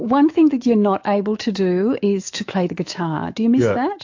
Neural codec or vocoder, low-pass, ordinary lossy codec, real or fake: autoencoder, 48 kHz, 128 numbers a frame, DAC-VAE, trained on Japanese speech; 7.2 kHz; AAC, 48 kbps; fake